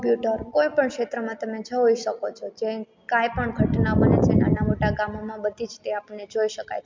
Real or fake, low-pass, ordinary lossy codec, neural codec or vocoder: real; 7.2 kHz; MP3, 64 kbps; none